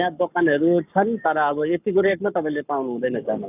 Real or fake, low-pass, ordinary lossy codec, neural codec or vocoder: fake; 3.6 kHz; none; codec, 44.1 kHz, 7.8 kbps, Pupu-Codec